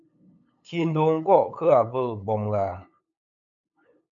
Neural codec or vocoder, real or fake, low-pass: codec, 16 kHz, 8 kbps, FunCodec, trained on LibriTTS, 25 frames a second; fake; 7.2 kHz